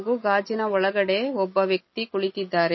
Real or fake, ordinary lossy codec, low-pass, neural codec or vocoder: real; MP3, 24 kbps; 7.2 kHz; none